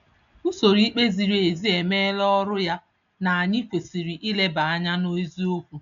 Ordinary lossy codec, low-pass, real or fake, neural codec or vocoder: none; 7.2 kHz; real; none